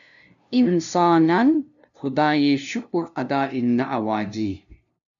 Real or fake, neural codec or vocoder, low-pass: fake; codec, 16 kHz, 0.5 kbps, FunCodec, trained on LibriTTS, 25 frames a second; 7.2 kHz